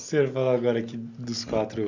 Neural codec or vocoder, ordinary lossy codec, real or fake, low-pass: none; none; real; 7.2 kHz